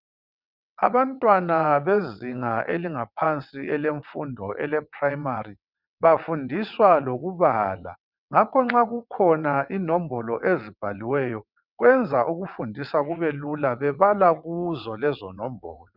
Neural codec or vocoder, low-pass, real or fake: vocoder, 22.05 kHz, 80 mel bands, WaveNeXt; 5.4 kHz; fake